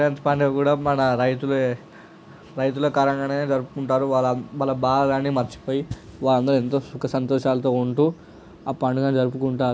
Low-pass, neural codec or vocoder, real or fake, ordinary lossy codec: none; none; real; none